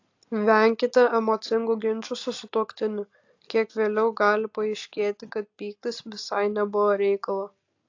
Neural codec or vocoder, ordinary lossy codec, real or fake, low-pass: none; AAC, 48 kbps; real; 7.2 kHz